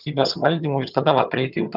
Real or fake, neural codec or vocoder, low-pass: fake; vocoder, 22.05 kHz, 80 mel bands, HiFi-GAN; 5.4 kHz